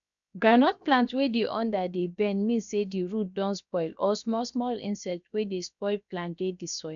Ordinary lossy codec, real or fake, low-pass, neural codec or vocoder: none; fake; 7.2 kHz; codec, 16 kHz, 0.7 kbps, FocalCodec